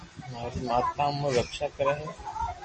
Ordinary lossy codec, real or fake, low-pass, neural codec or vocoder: MP3, 32 kbps; real; 10.8 kHz; none